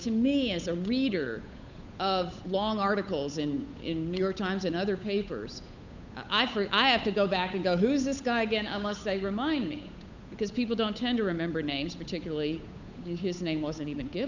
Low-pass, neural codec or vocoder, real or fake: 7.2 kHz; codec, 16 kHz, 8 kbps, FunCodec, trained on Chinese and English, 25 frames a second; fake